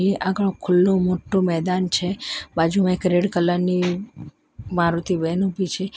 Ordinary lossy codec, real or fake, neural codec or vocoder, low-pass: none; real; none; none